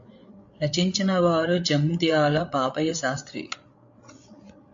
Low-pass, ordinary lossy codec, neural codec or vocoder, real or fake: 7.2 kHz; AAC, 64 kbps; codec, 16 kHz, 8 kbps, FreqCodec, larger model; fake